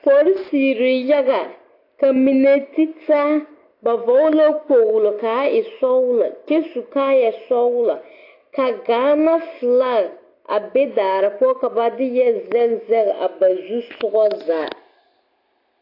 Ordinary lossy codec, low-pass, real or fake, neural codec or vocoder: AAC, 32 kbps; 5.4 kHz; real; none